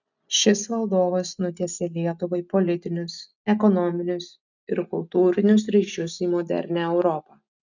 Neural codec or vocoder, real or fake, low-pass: none; real; 7.2 kHz